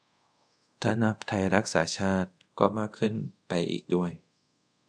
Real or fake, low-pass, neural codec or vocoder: fake; 9.9 kHz; codec, 24 kHz, 0.5 kbps, DualCodec